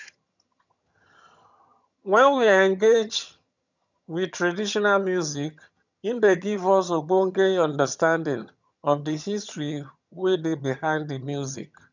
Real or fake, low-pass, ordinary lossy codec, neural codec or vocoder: fake; 7.2 kHz; none; vocoder, 22.05 kHz, 80 mel bands, HiFi-GAN